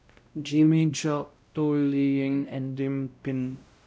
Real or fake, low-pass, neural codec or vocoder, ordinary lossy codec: fake; none; codec, 16 kHz, 0.5 kbps, X-Codec, WavLM features, trained on Multilingual LibriSpeech; none